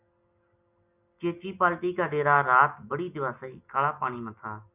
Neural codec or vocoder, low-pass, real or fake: none; 3.6 kHz; real